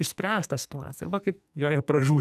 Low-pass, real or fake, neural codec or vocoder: 14.4 kHz; fake; codec, 44.1 kHz, 2.6 kbps, SNAC